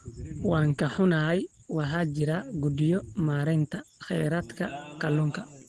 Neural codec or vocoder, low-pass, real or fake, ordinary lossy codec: none; 9.9 kHz; real; Opus, 16 kbps